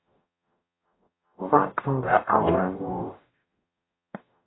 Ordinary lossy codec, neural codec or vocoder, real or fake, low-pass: AAC, 16 kbps; codec, 44.1 kHz, 0.9 kbps, DAC; fake; 7.2 kHz